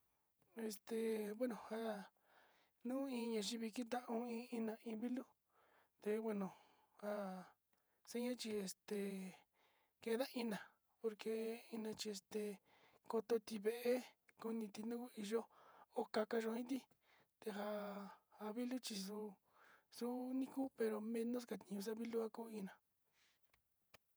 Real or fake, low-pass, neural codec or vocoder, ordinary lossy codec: fake; none; vocoder, 48 kHz, 128 mel bands, Vocos; none